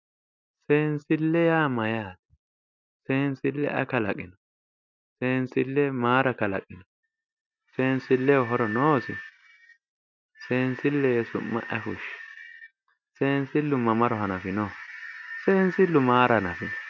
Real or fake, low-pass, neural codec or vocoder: real; 7.2 kHz; none